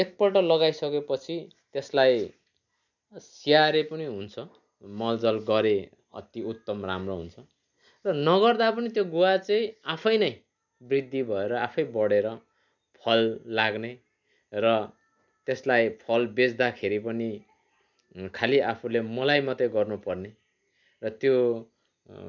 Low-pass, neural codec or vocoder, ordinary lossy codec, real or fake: 7.2 kHz; none; none; real